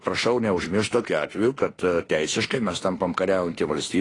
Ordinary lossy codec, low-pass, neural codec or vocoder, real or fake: AAC, 32 kbps; 10.8 kHz; autoencoder, 48 kHz, 32 numbers a frame, DAC-VAE, trained on Japanese speech; fake